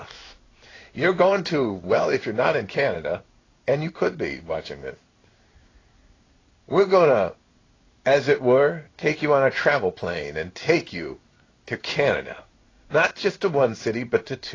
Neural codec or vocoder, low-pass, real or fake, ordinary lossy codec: codec, 16 kHz in and 24 kHz out, 1 kbps, XY-Tokenizer; 7.2 kHz; fake; AAC, 32 kbps